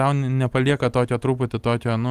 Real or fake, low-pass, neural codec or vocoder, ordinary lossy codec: real; 14.4 kHz; none; Opus, 24 kbps